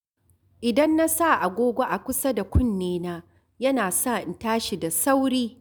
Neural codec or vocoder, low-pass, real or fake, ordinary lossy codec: none; none; real; none